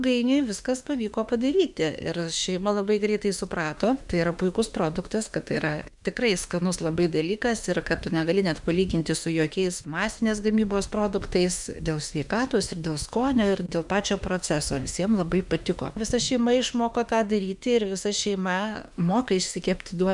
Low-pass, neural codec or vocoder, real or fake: 10.8 kHz; autoencoder, 48 kHz, 32 numbers a frame, DAC-VAE, trained on Japanese speech; fake